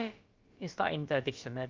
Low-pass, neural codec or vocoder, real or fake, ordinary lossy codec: 7.2 kHz; codec, 16 kHz, about 1 kbps, DyCAST, with the encoder's durations; fake; Opus, 32 kbps